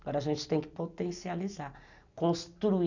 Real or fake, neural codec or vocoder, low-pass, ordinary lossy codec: real; none; 7.2 kHz; none